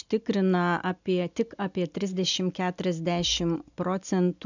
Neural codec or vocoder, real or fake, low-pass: none; real; 7.2 kHz